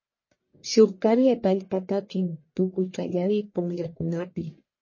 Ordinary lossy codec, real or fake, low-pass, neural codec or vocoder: MP3, 32 kbps; fake; 7.2 kHz; codec, 44.1 kHz, 1.7 kbps, Pupu-Codec